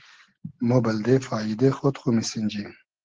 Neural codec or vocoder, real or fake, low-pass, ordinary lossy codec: none; real; 7.2 kHz; Opus, 16 kbps